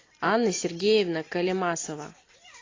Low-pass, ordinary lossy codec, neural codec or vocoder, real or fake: 7.2 kHz; AAC, 32 kbps; none; real